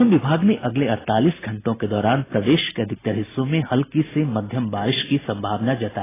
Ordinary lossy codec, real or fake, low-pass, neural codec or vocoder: AAC, 16 kbps; real; 3.6 kHz; none